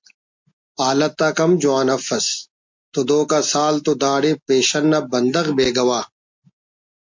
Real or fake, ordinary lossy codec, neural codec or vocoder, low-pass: real; MP3, 48 kbps; none; 7.2 kHz